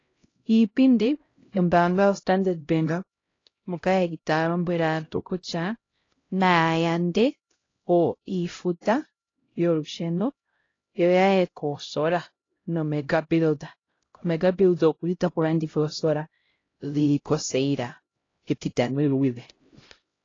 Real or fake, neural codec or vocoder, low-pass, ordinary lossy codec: fake; codec, 16 kHz, 0.5 kbps, X-Codec, HuBERT features, trained on LibriSpeech; 7.2 kHz; AAC, 32 kbps